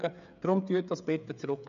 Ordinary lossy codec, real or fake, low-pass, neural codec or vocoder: none; fake; 7.2 kHz; codec, 16 kHz, 16 kbps, FreqCodec, smaller model